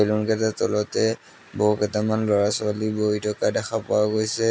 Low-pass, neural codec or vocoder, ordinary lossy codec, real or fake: none; none; none; real